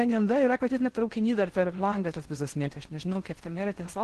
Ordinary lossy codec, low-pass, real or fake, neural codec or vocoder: Opus, 16 kbps; 10.8 kHz; fake; codec, 16 kHz in and 24 kHz out, 0.6 kbps, FocalCodec, streaming, 4096 codes